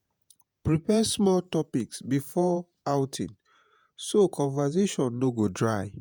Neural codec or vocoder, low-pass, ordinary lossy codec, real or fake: vocoder, 48 kHz, 128 mel bands, Vocos; none; none; fake